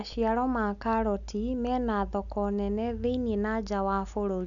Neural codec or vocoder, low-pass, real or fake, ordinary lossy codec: none; 7.2 kHz; real; none